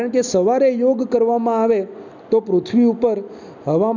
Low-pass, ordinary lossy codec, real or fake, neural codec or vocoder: 7.2 kHz; none; real; none